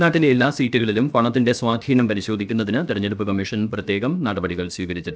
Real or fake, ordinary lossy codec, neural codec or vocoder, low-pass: fake; none; codec, 16 kHz, 0.7 kbps, FocalCodec; none